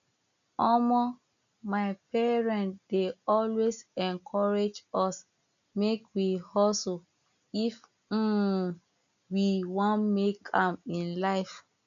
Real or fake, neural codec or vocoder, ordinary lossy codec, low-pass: real; none; none; 7.2 kHz